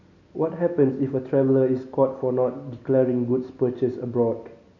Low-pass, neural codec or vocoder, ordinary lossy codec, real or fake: 7.2 kHz; none; MP3, 64 kbps; real